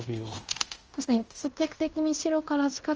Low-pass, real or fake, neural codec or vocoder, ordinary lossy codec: 7.2 kHz; fake; codec, 16 kHz in and 24 kHz out, 0.9 kbps, LongCat-Audio-Codec, fine tuned four codebook decoder; Opus, 24 kbps